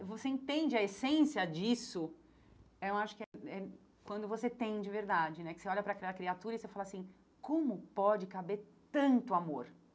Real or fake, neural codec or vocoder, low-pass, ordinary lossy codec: real; none; none; none